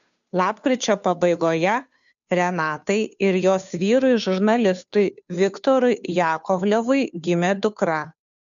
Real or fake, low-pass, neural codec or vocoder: fake; 7.2 kHz; codec, 16 kHz, 2 kbps, FunCodec, trained on Chinese and English, 25 frames a second